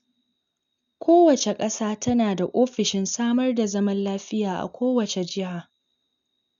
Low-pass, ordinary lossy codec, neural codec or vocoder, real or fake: 7.2 kHz; none; none; real